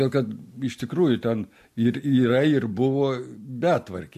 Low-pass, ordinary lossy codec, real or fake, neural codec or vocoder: 14.4 kHz; MP3, 64 kbps; real; none